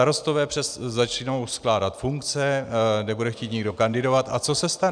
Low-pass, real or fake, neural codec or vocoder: 9.9 kHz; real; none